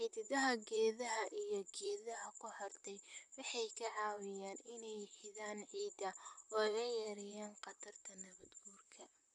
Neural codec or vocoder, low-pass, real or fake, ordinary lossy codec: vocoder, 44.1 kHz, 128 mel bands, Pupu-Vocoder; 10.8 kHz; fake; none